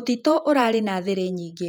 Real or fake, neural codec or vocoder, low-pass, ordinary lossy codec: fake; vocoder, 48 kHz, 128 mel bands, Vocos; 19.8 kHz; none